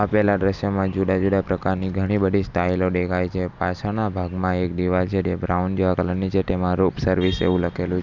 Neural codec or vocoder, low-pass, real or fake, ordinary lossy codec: none; 7.2 kHz; real; none